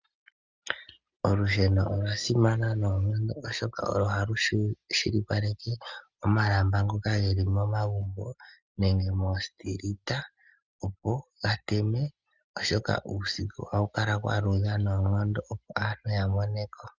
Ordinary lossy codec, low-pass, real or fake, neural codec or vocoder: Opus, 32 kbps; 7.2 kHz; real; none